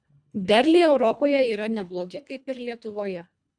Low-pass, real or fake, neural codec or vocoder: 9.9 kHz; fake; codec, 24 kHz, 1.5 kbps, HILCodec